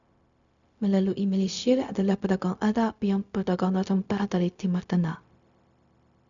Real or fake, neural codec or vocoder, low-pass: fake; codec, 16 kHz, 0.4 kbps, LongCat-Audio-Codec; 7.2 kHz